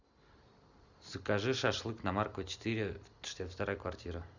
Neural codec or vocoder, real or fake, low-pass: none; real; 7.2 kHz